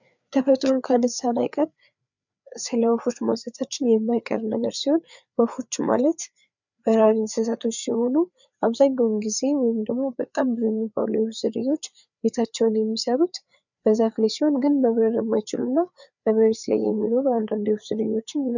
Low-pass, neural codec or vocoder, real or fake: 7.2 kHz; codec, 16 kHz, 4 kbps, FreqCodec, larger model; fake